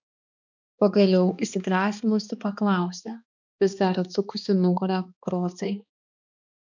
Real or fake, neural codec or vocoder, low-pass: fake; codec, 16 kHz, 2 kbps, X-Codec, HuBERT features, trained on balanced general audio; 7.2 kHz